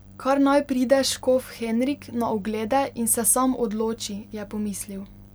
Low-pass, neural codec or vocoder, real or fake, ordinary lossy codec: none; none; real; none